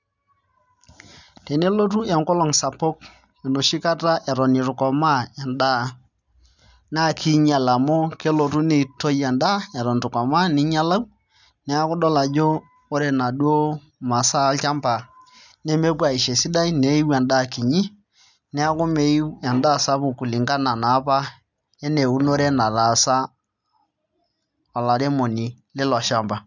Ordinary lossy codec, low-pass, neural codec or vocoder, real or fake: none; 7.2 kHz; none; real